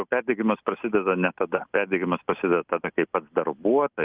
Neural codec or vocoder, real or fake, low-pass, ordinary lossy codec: none; real; 3.6 kHz; Opus, 32 kbps